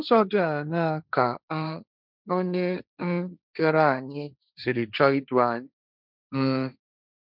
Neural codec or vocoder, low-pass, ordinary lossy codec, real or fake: codec, 16 kHz, 1.1 kbps, Voila-Tokenizer; 5.4 kHz; none; fake